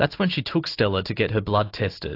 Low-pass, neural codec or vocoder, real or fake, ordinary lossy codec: 5.4 kHz; none; real; AAC, 32 kbps